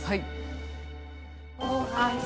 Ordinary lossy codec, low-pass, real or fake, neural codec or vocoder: none; none; real; none